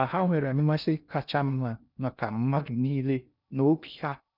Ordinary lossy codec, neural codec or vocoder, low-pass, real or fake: none; codec, 16 kHz in and 24 kHz out, 0.6 kbps, FocalCodec, streaming, 2048 codes; 5.4 kHz; fake